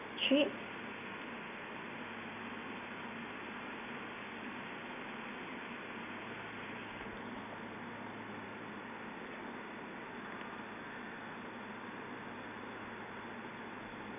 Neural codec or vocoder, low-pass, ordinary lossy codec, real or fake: none; 3.6 kHz; none; real